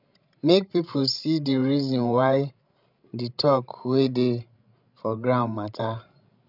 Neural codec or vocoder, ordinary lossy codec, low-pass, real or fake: codec, 16 kHz, 16 kbps, FreqCodec, larger model; none; 5.4 kHz; fake